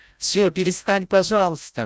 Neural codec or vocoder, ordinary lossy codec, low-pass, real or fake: codec, 16 kHz, 0.5 kbps, FreqCodec, larger model; none; none; fake